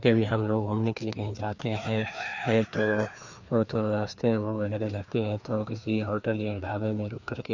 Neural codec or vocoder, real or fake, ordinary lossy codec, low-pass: codec, 16 kHz, 2 kbps, FreqCodec, larger model; fake; none; 7.2 kHz